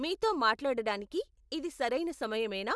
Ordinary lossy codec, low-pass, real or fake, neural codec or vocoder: none; none; real; none